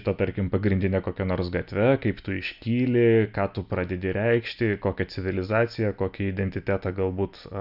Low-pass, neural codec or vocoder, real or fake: 5.4 kHz; none; real